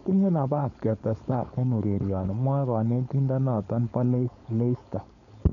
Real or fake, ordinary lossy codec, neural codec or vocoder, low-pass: fake; none; codec, 16 kHz, 4.8 kbps, FACodec; 7.2 kHz